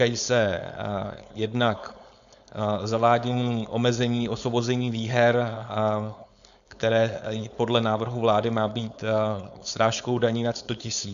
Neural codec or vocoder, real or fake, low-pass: codec, 16 kHz, 4.8 kbps, FACodec; fake; 7.2 kHz